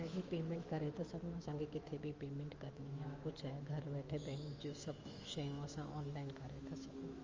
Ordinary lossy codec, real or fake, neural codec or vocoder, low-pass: Opus, 32 kbps; fake; autoencoder, 48 kHz, 128 numbers a frame, DAC-VAE, trained on Japanese speech; 7.2 kHz